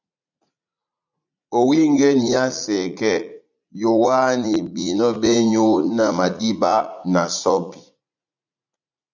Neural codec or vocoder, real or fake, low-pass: vocoder, 44.1 kHz, 80 mel bands, Vocos; fake; 7.2 kHz